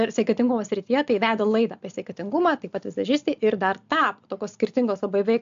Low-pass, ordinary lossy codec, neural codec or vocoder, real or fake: 7.2 kHz; AAC, 64 kbps; none; real